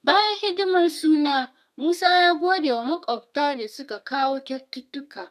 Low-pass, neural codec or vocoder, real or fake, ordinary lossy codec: 14.4 kHz; codec, 32 kHz, 1.9 kbps, SNAC; fake; none